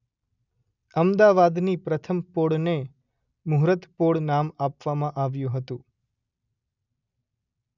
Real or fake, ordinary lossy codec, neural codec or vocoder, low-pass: real; none; none; 7.2 kHz